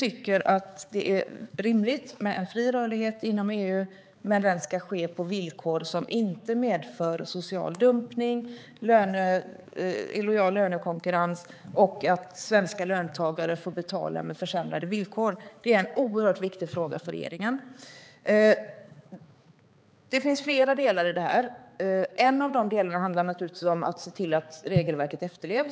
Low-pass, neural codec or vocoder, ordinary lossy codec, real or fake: none; codec, 16 kHz, 4 kbps, X-Codec, HuBERT features, trained on balanced general audio; none; fake